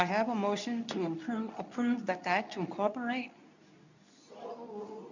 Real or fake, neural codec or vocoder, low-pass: fake; codec, 24 kHz, 0.9 kbps, WavTokenizer, medium speech release version 2; 7.2 kHz